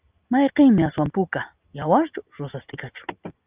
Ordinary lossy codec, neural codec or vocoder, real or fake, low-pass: Opus, 24 kbps; none; real; 3.6 kHz